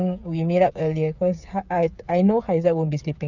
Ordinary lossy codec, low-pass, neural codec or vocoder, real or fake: none; 7.2 kHz; codec, 16 kHz, 8 kbps, FreqCodec, smaller model; fake